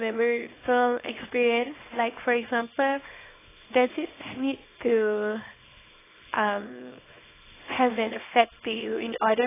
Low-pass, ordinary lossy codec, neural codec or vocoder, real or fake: 3.6 kHz; AAC, 16 kbps; codec, 24 kHz, 0.9 kbps, WavTokenizer, small release; fake